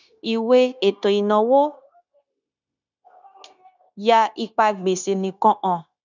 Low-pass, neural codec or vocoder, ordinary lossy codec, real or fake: 7.2 kHz; codec, 16 kHz, 0.9 kbps, LongCat-Audio-Codec; none; fake